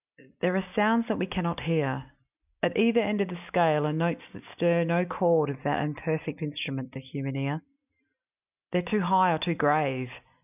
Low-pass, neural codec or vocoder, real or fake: 3.6 kHz; none; real